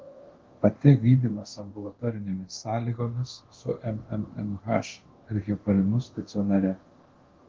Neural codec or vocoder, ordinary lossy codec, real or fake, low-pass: codec, 24 kHz, 0.9 kbps, DualCodec; Opus, 16 kbps; fake; 7.2 kHz